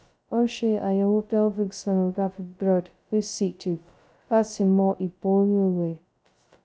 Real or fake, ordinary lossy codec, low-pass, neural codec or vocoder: fake; none; none; codec, 16 kHz, 0.2 kbps, FocalCodec